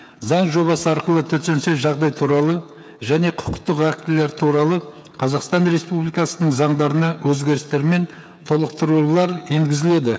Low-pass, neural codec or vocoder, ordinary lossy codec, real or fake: none; codec, 16 kHz, 16 kbps, FreqCodec, smaller model; none; fake